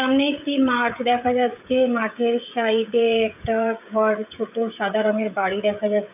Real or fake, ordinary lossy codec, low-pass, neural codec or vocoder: fake; none; 3.6 kHz; codec, 16 kHz, 16 kbps, FreqCodec, smaller model